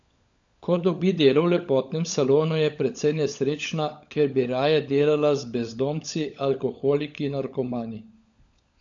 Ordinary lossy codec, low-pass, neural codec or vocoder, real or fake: AAC, 64 kbps; 7.2 kHz; codec, 16 kHz, 16 kbps, FunCodec, trained on LibriTTS, 50 frames a second; fake